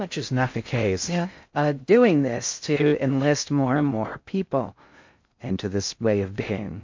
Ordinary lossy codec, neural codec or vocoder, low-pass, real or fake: MP3, 48 kbps; codec, 16 kHz in and 24 kHz out, 0.6 kbps, FocalCodec, streaming, 2048 codes; 7.2 kHz; fake